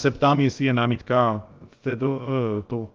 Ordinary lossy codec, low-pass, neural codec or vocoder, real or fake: Opus, 32 kbps; 7.2 kHz; codec, 16 kHz, about 1 kbps, DyCAST, with the encoder's durations; fake